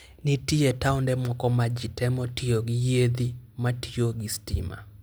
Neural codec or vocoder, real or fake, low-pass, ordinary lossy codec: none; real; none; none